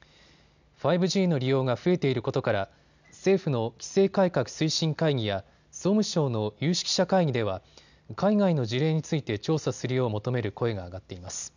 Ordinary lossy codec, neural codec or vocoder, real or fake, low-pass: none; none; real; 7.2 kHz